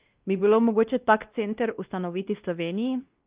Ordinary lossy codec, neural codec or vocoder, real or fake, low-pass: Opus, 32 kbps; codec, 16 kHz, 1 kbps, X-Codec, WavLM features, trained on Multilingual LibriSpeech; fake; 3.6 kHz